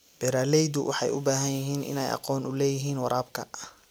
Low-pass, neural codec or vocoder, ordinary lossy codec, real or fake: none; none; none; real